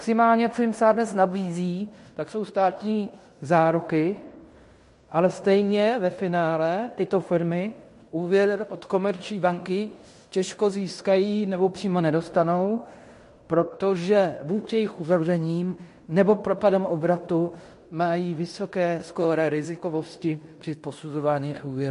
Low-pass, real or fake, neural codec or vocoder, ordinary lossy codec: 10.8 kHz; fake; codec, 16 kHz in and 24 kHz out, 0.9 kbps, LongCat-Audio-Codec, fine tuned four codebook decoder; MP3, 48 kbps